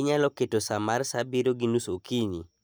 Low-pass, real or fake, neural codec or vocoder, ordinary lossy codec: none; real; none; none